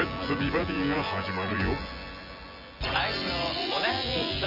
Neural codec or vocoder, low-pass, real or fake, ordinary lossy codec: vocoder, 24 kHz, 100 mel bands, Vocos; 5.4 kHz; fake; none